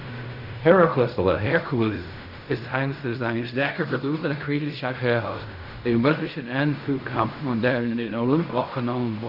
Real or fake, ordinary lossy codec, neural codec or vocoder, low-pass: fake; none; codec, 16 kHz in and 24 kHz out, 0.4 kbps, LongCat-Audio-Codec, fine tuned four codebook decoder; 5.4 kHz